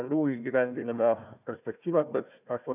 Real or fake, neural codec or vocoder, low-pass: fake; codec, 16 kHz, 1 kbps, FunCodec, trained on Chinese and English, 50 frames a second; 3.6 kHz